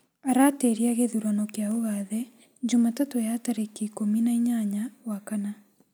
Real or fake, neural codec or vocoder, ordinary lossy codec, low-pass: real; none; none; none